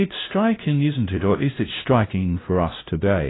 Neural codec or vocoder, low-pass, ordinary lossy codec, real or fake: codec, 16 kHz, 0.5 kbps, FunCodec, trained on LibriTTS, 25 frames a second; 7.2 kHz; AAC, 16 kbps; fake